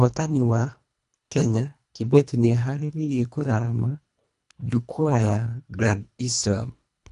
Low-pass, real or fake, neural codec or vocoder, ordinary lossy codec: 10.8 kHz; fake; codec, 24 kHz, 1.5 kbps, HILCodec; none